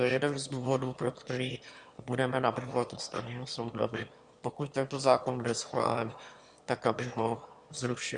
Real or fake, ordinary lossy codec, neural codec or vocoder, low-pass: fake; Opus, 64 kbps; autoencoder, 22.05 kHz, a latent of 192 numbers a frame, VITS, trained on one speaker; 9.9 kHz